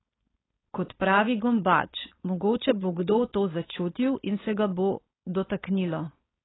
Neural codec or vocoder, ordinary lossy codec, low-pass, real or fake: codec, 16 kHz, 4.8 kbps, FACodec; AAC, 16 kbps; 7.2 kHz; fake